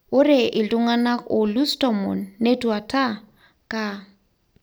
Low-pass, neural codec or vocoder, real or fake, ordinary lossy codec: none; none; real; none